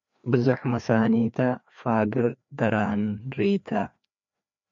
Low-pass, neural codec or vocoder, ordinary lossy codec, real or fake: 7.2 kHz; codec, 16 kHz, 2 kbps, FreqCodec, larger model; MP3, 48 kbps; fake